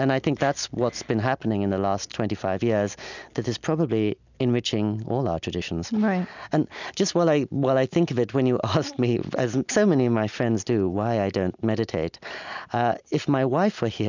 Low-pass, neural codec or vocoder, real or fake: 7.2 kHz; none; real